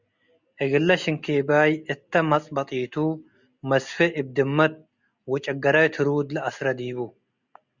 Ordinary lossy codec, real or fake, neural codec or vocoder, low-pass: Opus, 64 kbps; real; none; 7.2 kHz